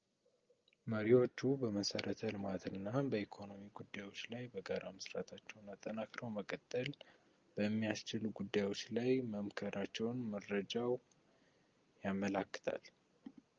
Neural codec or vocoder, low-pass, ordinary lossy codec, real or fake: none; 7.2 kHz; Opus, 24 kbps; real